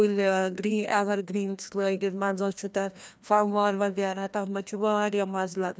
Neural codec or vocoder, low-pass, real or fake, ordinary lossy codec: codec, 16 kHz, 1 kbps, FreqCodec, larger model; none; fake; none